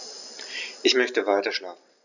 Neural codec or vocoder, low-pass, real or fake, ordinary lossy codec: none; 7.2 kHz; real; none